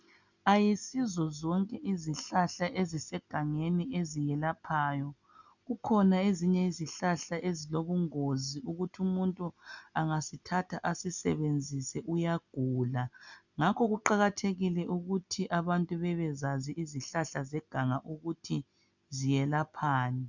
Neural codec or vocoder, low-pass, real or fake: none; 7.2 kHz; real